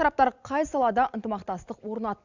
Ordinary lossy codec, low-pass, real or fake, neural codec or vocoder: none; 7.2 kHz; real; none